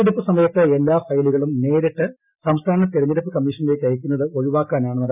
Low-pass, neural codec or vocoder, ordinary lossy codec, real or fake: 3.6 kHz; none; none; real